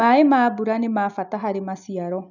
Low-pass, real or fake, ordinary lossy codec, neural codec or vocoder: 7.2 kHz; real; none; none